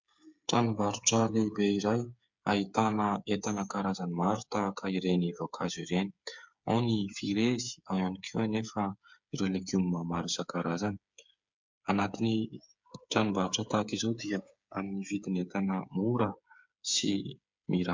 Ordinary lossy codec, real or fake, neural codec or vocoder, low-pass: MP3, 64 kbps; fake; codec, 16 kHz, 8 kbps, FreqCodec, smaller model; 7.2 kHz